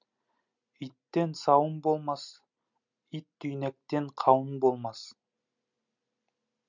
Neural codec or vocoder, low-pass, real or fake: none; 7.2 kHz; real